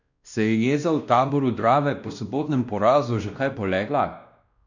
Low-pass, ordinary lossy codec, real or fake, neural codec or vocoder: 7.2 kHz; none; fake; codec, 16 kHz, 1 kbps, X-Codec, WavLM features, trained on Multilingual LibriSpeech